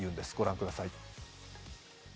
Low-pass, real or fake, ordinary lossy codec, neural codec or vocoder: none; real; none; none